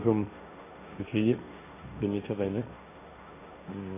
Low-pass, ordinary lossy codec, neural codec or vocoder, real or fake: 3.6 kHz; MP3, 32 kbps; codec, 16 kHz, 1.1 kbps, Voila-Tokenizer; fake